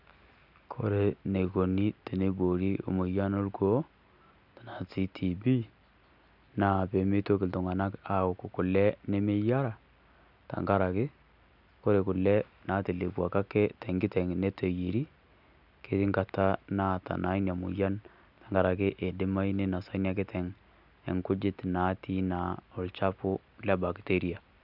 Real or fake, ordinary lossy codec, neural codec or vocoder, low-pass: real; none; none; 5.4 kHz